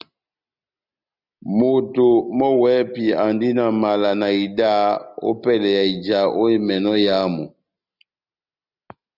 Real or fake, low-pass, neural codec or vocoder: real; 5.4 kHz; none